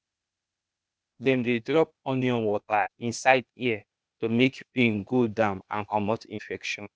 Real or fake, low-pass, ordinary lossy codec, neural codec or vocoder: fake; none; none; codec, 16 kHz, 0.8 kbps, ZipCodec